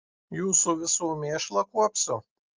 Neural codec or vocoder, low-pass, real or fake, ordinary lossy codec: none; 7.2 kHz; real; Opus, 24 kbps